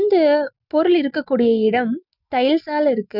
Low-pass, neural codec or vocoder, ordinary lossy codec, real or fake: 5.4 kHz; none; none; real